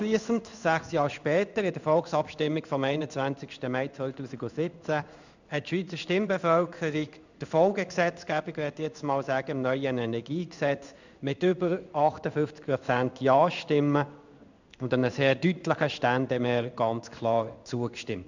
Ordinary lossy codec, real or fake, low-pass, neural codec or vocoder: none; fake; 7.2 kHz; codec, 16 kHz in and 24 kHz out, 1 kbps, XY-Tokenizer